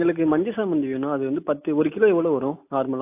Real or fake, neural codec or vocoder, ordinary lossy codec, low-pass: real; none; AAC, 32 kbps; 3.6 kHz